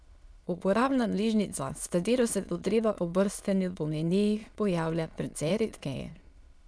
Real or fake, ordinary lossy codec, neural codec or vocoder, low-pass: fake; none; autoencoder, 22.05 kHz, a latent of 192 numbers a frame, VITS, trained on many speakers; none